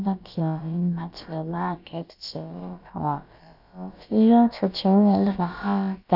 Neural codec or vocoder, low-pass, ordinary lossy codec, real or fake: codec, 16 kHz, about 1 kbps, DyCAST, with the encoder's durations; 5.4 kHz; none; fake